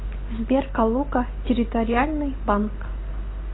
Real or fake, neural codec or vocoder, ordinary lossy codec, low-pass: real; none; AAC, 16 kbps; 7.2 kHz